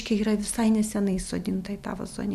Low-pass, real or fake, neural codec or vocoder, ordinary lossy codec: 14.4 kHz; real; none; MP3, 96 kbps